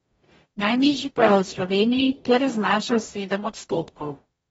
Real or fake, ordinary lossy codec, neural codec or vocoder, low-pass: fake; AAC, 24 kbps; codec, 44.1 kHz, 0.9 kbps, DAC; 19.8 kHz